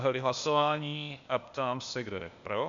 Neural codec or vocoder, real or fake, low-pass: codec, 16 kHz, 0.8 kbps, ZipCodec; fake; 7.2 kHz